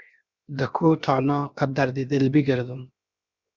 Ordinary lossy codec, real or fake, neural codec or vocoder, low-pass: Opus, 64 kbps; fake; codec, 16 kHz, 0.7 kbps, FocalCodec; 7.2 kHz